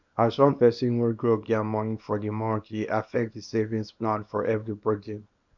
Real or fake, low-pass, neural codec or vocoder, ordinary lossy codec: fake; 7.2 kHz; codec, 24 kHz, 0.9 kbps, WavTokenizer, small release; none